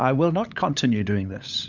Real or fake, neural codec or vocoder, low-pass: fake; codec, 16 kHz, 8 kbps, FunCodec, trained on LibriTTS, 25 frames a second; 7.2 kHz